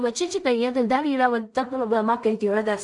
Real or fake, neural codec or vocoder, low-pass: fake; codec, 16 kHz in and 24 kHz out, 0.4 kbps, LongCat-Audio-Codec, two codebook decoder; 10.8 kHz